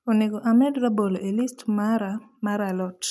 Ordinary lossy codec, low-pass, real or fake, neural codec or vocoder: none; none; real; none